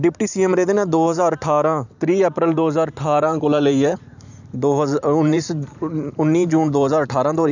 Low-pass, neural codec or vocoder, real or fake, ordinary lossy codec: 7.2 kHz; vocoder, 44.1 kHz, 128 mel bands every 512 samples, BigVGAN v2; fake; none